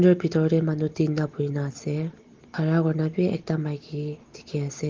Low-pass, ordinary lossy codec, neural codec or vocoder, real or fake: 7.2 kHz; Opus, 16 kbps; none; real